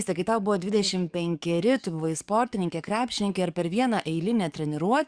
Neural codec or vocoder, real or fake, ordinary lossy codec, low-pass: codec, 44.1 kHz, 7.8 kbps, DAC; fake; Opus, 64 kbps; 9.9 kHz